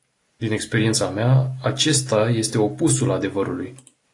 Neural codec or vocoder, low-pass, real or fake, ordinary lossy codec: none; 10.8 kHz; real; AAC, 48 kbps